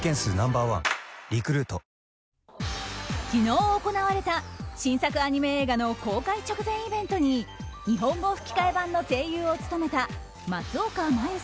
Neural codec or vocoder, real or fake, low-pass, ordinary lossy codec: none; real; none; none